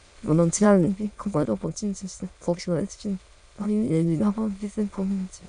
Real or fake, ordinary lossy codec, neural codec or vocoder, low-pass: fake; none; autoencoder, 22.05 kHz, a latent of 192 numbers a frame, VITS, trained on many speakers; 9.9 kHz